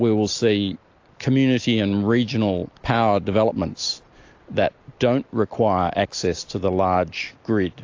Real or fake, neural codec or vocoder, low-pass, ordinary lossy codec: real; none; 7.2 kHz; AAC, 48 kbps